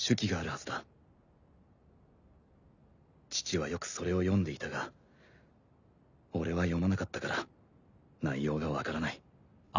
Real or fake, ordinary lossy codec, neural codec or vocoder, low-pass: real; none; none; 7.2 kHz